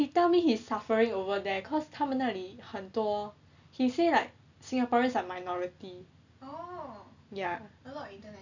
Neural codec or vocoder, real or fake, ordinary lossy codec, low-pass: none; real; none; 7.2 kHz